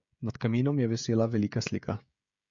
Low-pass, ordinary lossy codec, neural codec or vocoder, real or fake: 7.2 kHz; MP3, 64 kbps; codec, 16 kHz, 16 kbps, FreqCodec, smaller model; fake